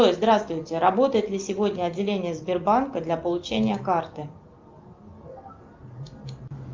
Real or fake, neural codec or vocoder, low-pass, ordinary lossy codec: real; none; 7.2 kHz; Opus, 32 kbps